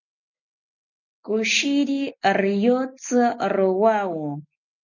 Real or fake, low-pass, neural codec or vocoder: real; 7.2 kHz; none